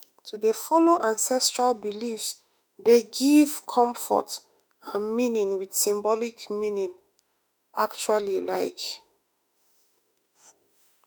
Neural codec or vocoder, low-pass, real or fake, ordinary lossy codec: autoencoder, 48 kHz, 32 numbers a frame, DAC-VAE, trained on Japanese speech; none; fake; none